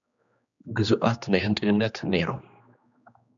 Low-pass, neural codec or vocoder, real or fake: 7.2 kHz; codec, 16 kHz, 2 kbps, X-Codec, HuBERT features, trained on general audio; fake